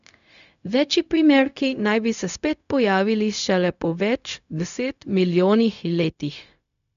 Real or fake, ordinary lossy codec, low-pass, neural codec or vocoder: fake; none; 7.2 kHz; codec, 16 kHz, 0.4 kbps, LongCat-Audio-Codec